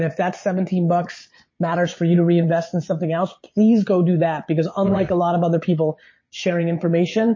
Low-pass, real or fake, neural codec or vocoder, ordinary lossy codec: 7.2 kHz; fake; vocoder, 22.05 kHz, 80 mel bands, Vocos; MP3, 32 kbps